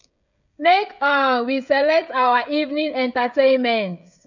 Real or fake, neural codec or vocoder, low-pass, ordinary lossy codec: fake; codec, 16 kHz, 16 kbps, FreqCodec, smaller model; 7.2 kHz; none